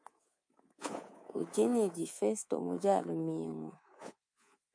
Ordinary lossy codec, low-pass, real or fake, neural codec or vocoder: MP3, 48 kbps; 9.9 kHz; fake; codec, 24 kHz, 3.1 kbps, DualCodec